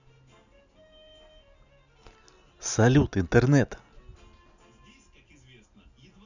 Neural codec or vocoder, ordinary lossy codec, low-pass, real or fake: none; none; 7.2 kHz; real